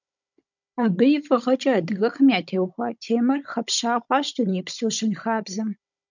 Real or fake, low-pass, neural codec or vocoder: fake; 7.2 kHz; codec, 16 kHz, 16 kbps, FunCodec, trained on Chinese and English, 50 frames a second